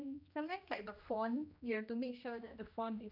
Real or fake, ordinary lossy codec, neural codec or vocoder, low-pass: fake; none; codec, 16 kHz, 1 kbps, X-Codec, HuBERT features, trained on general audio; 5.4 kHz